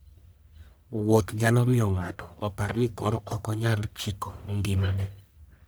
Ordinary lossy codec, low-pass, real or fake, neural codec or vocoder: none; none; fake; codec, 44.1 kHz, 1.7 kbps, Pupu-Codec